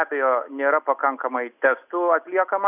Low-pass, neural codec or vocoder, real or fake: 3.6 kHz; none; real